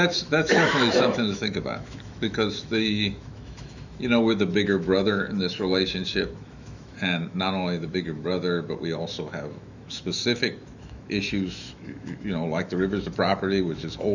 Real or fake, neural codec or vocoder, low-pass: fake; autoencoder, 48 kHz, 128 numbers a frame, DAC-VAE, trained on Japanese speech; 7.2 kHz